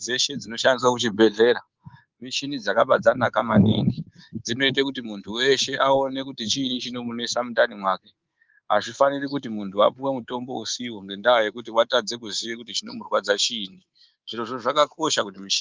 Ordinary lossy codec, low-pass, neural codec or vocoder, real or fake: Opus, 32 kbps; 7.2 kHz; codec, 24 kHz, 3.1 kbps, DualCodec; fake